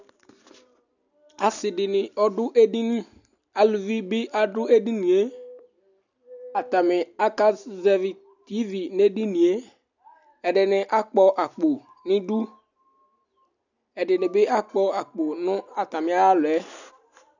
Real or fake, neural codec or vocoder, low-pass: real; none; 7.2 kHz